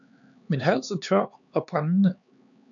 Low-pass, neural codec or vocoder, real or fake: 7.2 kHz; codec, 16 kHz, 4 kbps, X-Codec, HuBERT features, trained on LibriSpeech; fake